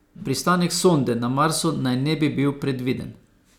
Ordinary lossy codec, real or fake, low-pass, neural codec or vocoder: none; real; 19.8 kHz; none